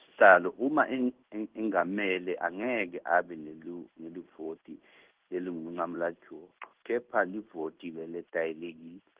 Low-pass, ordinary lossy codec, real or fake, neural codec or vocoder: 3.6 kHz; Opus, 16 kbps; fake; codec, 16 kHz in and 24 kHz out, 1 kbps, XY-Tokenizer